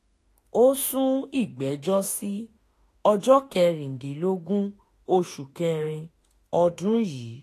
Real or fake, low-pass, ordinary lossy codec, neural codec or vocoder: fake; 14.4 kHz; AAC, 48 kbps; autoencoder, 48 kHz, 32 numbers a frame, DAC-VAE, trained on Japanese speech